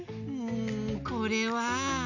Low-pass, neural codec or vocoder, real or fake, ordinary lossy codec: 7.2 kHz; none; real; none